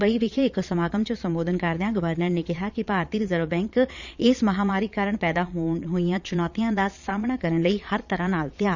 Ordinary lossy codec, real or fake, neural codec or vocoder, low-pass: none; fake; vocoder, 22.05 kHz, 80 mel bands, Vocos; 7.2 kHz